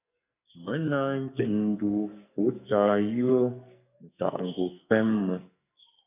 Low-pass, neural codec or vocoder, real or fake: 3.6 kHz; codec, 44.1 kHz, 2.6 kbps, SNAC; fake